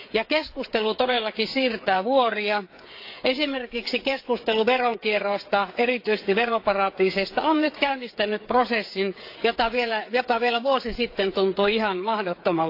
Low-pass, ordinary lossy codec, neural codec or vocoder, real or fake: 5.4 kHz; AAC, 32 kbps; codec, 16 kHz, 8 kbps, FreqCodec, smaller model; fake